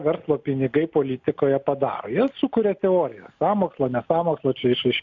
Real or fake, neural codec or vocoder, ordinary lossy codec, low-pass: real; none; MP3, 64 kbps; 7.2 kHz